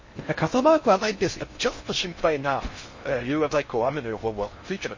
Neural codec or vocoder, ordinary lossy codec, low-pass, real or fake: codec, 16 kHz in and 24 kHz out, 0.6 kbps, FocalCodec, streaming, 4096 codes; MP3, 32 kbps; 7.2 kHz; fake